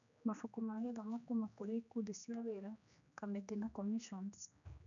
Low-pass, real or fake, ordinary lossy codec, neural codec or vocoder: 7.2 kHz; fake; none; codec, 16 kHz, 2 kbps, X-Codec, HuBERT features, trained on general audio